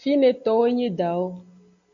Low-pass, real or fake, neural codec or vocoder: 7.2 kHz; real; none